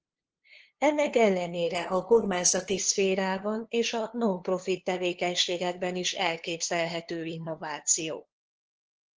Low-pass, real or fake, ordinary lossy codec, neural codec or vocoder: 7.2 kHz; fake; Opus, 16 kbps; codec, 16 kHz, 2 kbps, FunCodec, trained on LibriTTS, 25 frames a second